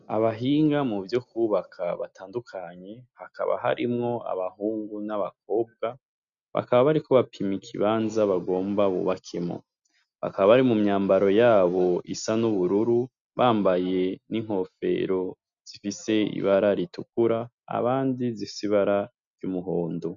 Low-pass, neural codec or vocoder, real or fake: 7.2 kHz; none; real